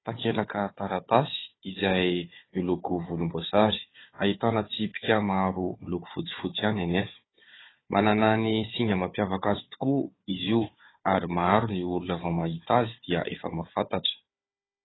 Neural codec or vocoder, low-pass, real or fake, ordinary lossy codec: codec, 16 kHz, 4 kbps, FunCodec, trained on Chinese and English, 50 frames a second; 7.2 kHz; fake; AAC, 16 kbps